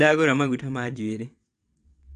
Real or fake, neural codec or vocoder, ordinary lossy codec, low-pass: fake; vocoder, 22.05 kHz, 80 mel bands, WaveNeXt; none; 9.9 kHz